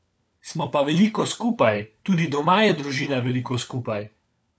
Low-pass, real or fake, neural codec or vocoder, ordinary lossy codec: none; fake; codec, 16 kHz, 4 kbps, FunCodec, trained on LibriTTS, 50 frames a second; none